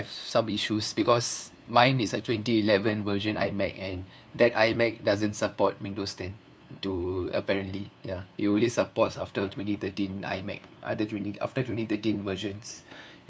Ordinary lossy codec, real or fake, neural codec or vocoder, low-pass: none; fake; codec, 16 kHz, 2 kbps, FunCodec, trained on LibriTTS, 25 frames a second; none